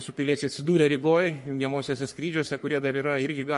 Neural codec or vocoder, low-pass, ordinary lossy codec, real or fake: codec, 44.1 kHz, 3.4 kbps, Pupu-Codec; 14.4 kHz; MP3, 48 kbps; fake